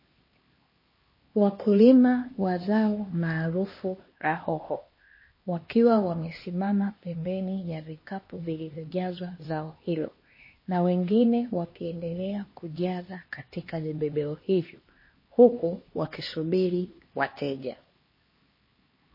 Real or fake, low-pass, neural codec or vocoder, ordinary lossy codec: fake; 5.4 kHz; codec, 16 kHz, 2 kbps, X-Codec, HuBERT features, trained on LibriSpeech; MP3, 24 kbps